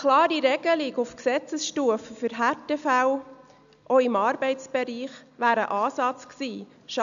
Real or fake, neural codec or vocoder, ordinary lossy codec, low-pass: real; none; none; 7.2 kHz